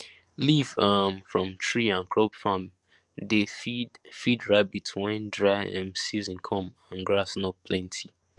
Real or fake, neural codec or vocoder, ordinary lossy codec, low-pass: fake; codec, 44.1 kHz, 7.8 kbps, DAC; none; 10.8 kHz